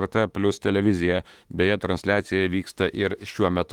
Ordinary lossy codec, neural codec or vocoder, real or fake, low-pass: Opus, 24 kbps; autoencoder, 48 kHz, 32 numbers a frame, DAC-VAE, trained on Japanese speech; fake; 19.8 kHz